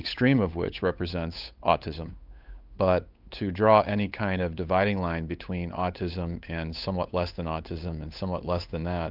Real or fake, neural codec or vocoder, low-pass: fake; codec, 16 kHz, 8 kbps, FunCodec, trained on Chinese and English, 25 frames a second; 5.4 kHz